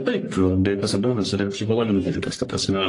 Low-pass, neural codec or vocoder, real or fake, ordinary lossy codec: 10.8 kHz; codec, 44.1 kHz, 1.7 kbps, Pupu-Codec; fake; AAC, 48 kbps